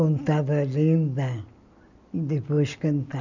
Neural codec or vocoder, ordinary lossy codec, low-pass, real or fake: none; none; 7.2 kHz; real